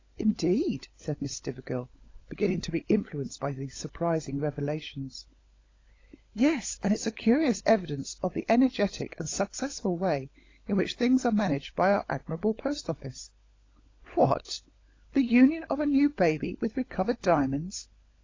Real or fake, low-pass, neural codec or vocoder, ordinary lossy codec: fake; 7.2 kHz; codec, 16 kHz, 16 kbps, FunCodec, trained on LibriTTS, 50 frames a second; AAC, 32 kbps